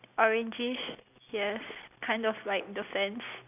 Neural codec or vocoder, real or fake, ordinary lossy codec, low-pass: none; real; none; 3.6 kHz